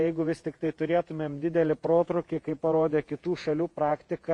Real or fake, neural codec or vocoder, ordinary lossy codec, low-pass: fake; vocoder, 48 kHz, 128 mel bands, Vocos; MP3, 64 kbps; 10.8 kHz